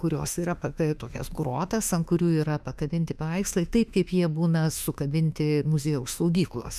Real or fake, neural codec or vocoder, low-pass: fake; autoencoder, 48 kHz, 32 numbers a frame, DAC-VAE, trained on Japanese speech; 14.4 kHz